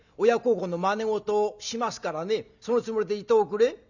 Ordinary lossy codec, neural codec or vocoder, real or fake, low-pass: none; none; real; 7.2 kHz